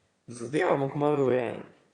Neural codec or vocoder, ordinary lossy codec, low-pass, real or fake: autoencoder, 22.05 kHz, a latent of 192 numbers a frame, VITS, trained on one speaker; Opus, 64 kbps; 9.9 kHz; fake